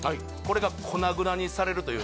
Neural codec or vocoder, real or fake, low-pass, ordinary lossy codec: none; real; none; none